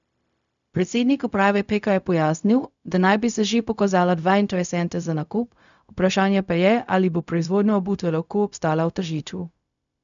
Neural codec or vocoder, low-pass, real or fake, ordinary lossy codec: codec, 16 kHz, 0.4 kbps, LongCat-Audio-Codec; 7.2 kHz; fake; none